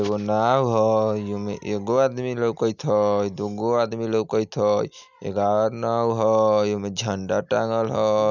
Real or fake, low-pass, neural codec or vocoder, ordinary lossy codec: real; 7.2 kHz; none; none